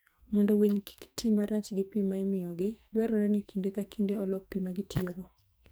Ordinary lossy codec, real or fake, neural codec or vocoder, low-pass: none; fake; codec, 44.1 kHz, 2.6 kbps, SNAC; none